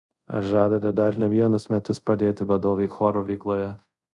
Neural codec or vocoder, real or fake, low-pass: codec, 24 kHz, 0.5 kbps, DualCodec; fake; 10.8 kHz